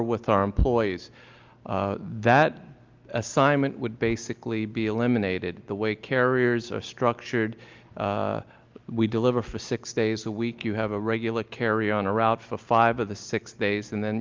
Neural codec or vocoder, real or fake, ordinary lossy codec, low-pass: none; real; Opus, 24 kbps; 7.2 kHz